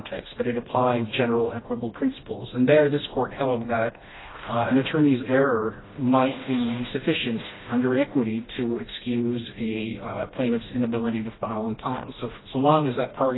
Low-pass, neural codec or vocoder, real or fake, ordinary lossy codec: 7.2 kHz; codec, 16 kHz, 1 kbps, FreqCodec, smaller model; fake; AAC, 16 kbps